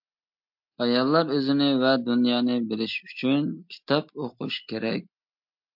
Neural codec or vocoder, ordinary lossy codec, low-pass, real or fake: none; MP3, 48 kbps; 5.4 kHz; real